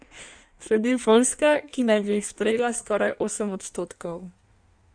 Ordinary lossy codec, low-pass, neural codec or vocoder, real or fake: none; 9.9 kHz; codec, 16 kHz in and 24 kHz out, 1.1 kbps, FireRedTTS-2 codec; fake